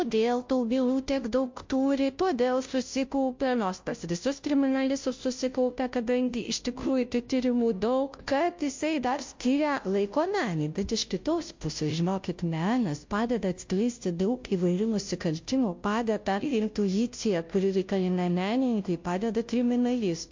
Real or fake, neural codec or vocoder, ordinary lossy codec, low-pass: fake; codec, 16 kHz, 0.5 kbps, FunCodec, trained on Chinese and English, 25 frames a second; MP3, 48 kbps; 7.2 kHz